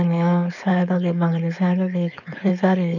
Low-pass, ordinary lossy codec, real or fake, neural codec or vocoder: 7.2 kHz; none; fake; codec, 16 kHz, 4.8 kbps, FACodec